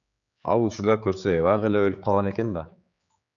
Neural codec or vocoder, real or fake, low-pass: codec, 16 kHz, 4 kbps, X-Codec, HuBERT features, trained on general audio; fake; 7.2 kHz